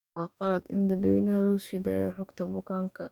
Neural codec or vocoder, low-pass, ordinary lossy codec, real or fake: codec, 44.1 kHz, 2.6 kbps, DAC; 19.8 kHz; none; fake